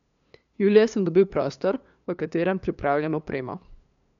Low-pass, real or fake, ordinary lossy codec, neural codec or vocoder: 7.2 kHz; fake; none; codec, 16 kHz, 2 kbps, FunCodec, trained on LibriTTS, 25 frames a second